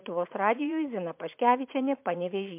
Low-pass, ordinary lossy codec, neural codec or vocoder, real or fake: 3.6 kHz; MP3, 32 kbps; vocoder, 44.1 kHz, 80 mel bands, Vocos; fake